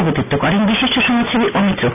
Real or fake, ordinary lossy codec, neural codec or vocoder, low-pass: real; none; none; 3.6 kHz